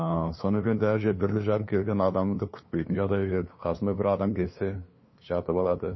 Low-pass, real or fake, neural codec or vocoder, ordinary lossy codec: 7.2 kHz; fake; codec, 16 kHz, 2 kbps, FunCodec, trained on LibriTTS, 25 frames a second; MP3, 24 kbps